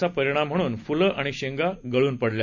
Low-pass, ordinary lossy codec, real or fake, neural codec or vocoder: 7.2 kHz; none; real; none